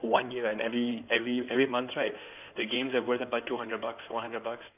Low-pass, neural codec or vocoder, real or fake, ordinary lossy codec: 3.6 kHz; codec, 16 kHz in and 24 kHz out, 2.2 kbps, FireRedTTS-2 codec; fake; none